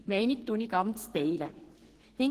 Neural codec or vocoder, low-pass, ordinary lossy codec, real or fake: codec, 44.1 kHz, 2.6 kbps, SNAC; 14.4 kHz; Opus, 16 kbps; fake